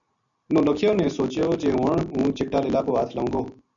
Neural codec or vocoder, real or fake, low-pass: none; real; 7.2 kHz